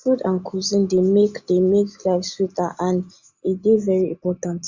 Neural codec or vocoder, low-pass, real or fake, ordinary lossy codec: none; 7.2 kHz; real; Opus, 64 kbps